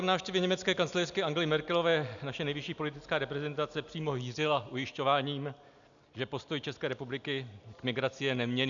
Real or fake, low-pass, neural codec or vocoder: real; 7.2 kHz; none